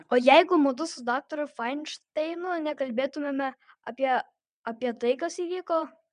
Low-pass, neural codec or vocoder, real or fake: 9.9 kHz; vocoder, 22.05 kHz, 80 mel bands, WaveNeXt; fake